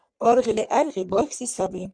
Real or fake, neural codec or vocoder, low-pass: fake; codec, 44.1 kHz, 3.4 kbps, Pupu-Codec; 9.9 kHz